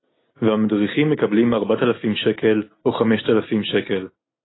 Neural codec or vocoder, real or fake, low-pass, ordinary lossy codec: none; real; 7.2 kHz; AAC, 16 kbps